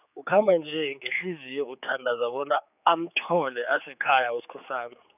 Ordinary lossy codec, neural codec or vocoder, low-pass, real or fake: none; codec, 16 kHz, 4 kbps, X-Codec, HuBERT features, trained on general audio; 3.6 kHz; fake